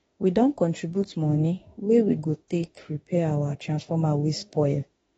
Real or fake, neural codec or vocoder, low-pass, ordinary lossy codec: fake; autoencoder, 48 kHz, 32 numbers a frame, DAC-VAE, trained on Japanese speech; 19.8 kHz; AAC, 24 kbps